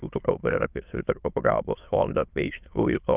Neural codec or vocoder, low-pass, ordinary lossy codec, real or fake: autoencoder, 22.05 kHz, a latent of 192 numbers a frame, VITS, trained on many speakers; 3.6 kHz; Opus, 32 kbps; fake